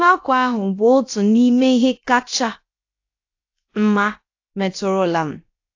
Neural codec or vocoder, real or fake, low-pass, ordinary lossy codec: codec, 16 kHz, about 1 kbps, DyCAST, with the encoder's durations; fake; 7.2 kHz; AAC, 48 kbps